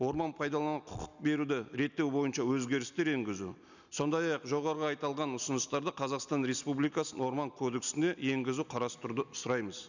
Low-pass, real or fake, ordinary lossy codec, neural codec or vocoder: 7.2 kHz; real; none; none